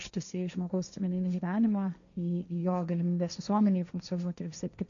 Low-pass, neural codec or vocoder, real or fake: 7.2 kHz; codec, 16 kHz, 1.1 kbps, Voila-Tokenizer; fake